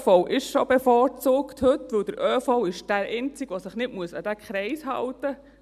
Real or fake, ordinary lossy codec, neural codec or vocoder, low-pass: real; none; none; 14.4 kHz